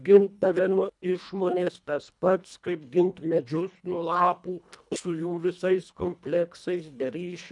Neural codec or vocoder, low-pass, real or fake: codec, 24 kHz, 1.5 kbps, HILCodec; 10.8 kHz; fake